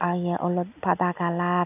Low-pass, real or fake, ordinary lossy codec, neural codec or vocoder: 3.6 kHz; real; none; none